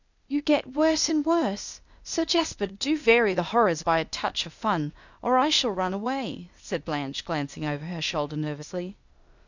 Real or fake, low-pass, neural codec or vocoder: fake; 7.2 kHz; codec, 16 kHz, 0.8 kbps, ZipCodec